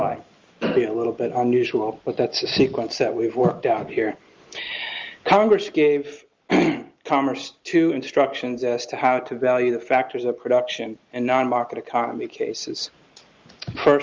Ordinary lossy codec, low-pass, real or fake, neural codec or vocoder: Opus, 32 kbps; 7.2 kHz; real; none